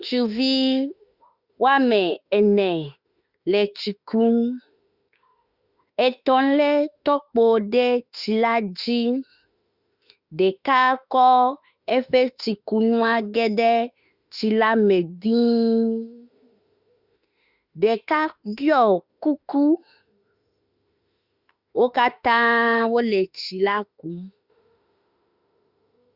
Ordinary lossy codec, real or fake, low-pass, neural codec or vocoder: Opus, 64 kbps; fake; 5.4 kHz; autoencoder, 48 kHz, 32 numbers a frame, DAC-VAE, trained on Japanese speech